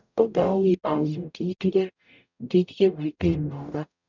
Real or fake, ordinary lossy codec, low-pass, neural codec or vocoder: fake; none; 7.2 kHz; codec, 44.1 kHz, 0.9 kbps, DAC